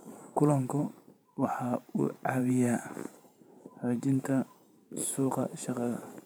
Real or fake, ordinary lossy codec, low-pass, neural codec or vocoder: fake; none; none; vocoder, 44.1 kHz, 128 mel bands every 512 samples, BigVGAN v2